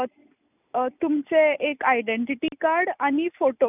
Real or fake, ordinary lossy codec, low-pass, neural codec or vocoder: real; none; 3.6 kHz; none